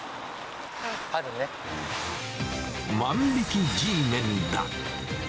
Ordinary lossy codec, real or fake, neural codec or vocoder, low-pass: none; real; none; none